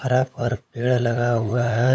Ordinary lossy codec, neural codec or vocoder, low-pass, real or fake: none; codec, 16 kHz, 8 kbps, FunCodec, trained on LibriTTS, 25 frames a second; none; fake